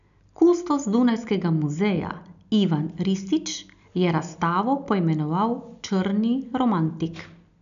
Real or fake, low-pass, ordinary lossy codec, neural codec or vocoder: real; 7.2 kHz; none; none